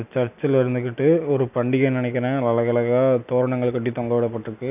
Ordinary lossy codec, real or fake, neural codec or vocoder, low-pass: none; real; none; 3.6 kHz